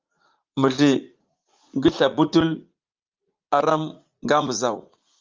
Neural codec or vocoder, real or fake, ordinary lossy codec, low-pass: vocoder, 44.1 kHz, 80 mel bands, Vocos; fake; Opus, 32 kbps; 7.2 kHz